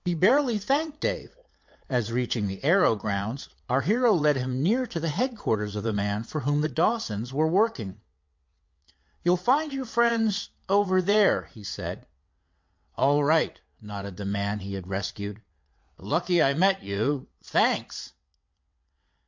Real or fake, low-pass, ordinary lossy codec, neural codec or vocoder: fake; 7.2 kHz; MP3, 48 kbps; vocoder, 22.05 kHz, 80 mel bands, WaveNeXt